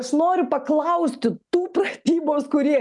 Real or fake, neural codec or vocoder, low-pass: real; none; 10.8 kHz